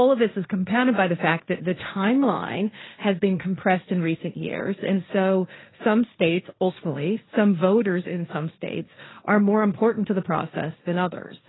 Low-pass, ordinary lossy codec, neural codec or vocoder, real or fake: 7.2 kHz; AAC, 16 kbps; codec, 16 kHz, 1.1 kbps, Voila-Tokenizer; fake